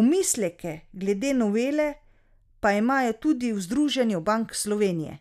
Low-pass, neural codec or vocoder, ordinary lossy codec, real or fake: 14.4 kHz; none; none; real